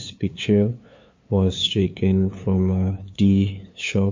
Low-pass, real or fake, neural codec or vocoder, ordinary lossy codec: 7.2 kHz; fake; codec, 16 kHz, 2 kbps, FunCodec, trained on LibriTTS, 25 frames a second; MP3, 48 kbps